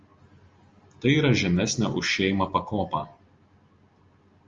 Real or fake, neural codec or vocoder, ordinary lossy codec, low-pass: real; none; Opus, 32 kbps; 7.2 kHz